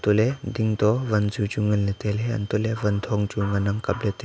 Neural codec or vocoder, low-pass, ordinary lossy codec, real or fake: none; none; none; real